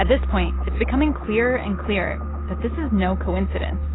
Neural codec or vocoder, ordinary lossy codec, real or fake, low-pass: none; AAC, 16 kbps; real; 7.2 kHz